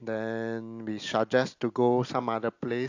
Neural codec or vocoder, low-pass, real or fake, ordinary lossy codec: none; 7.2 kHz; real; AAC, 48 kbps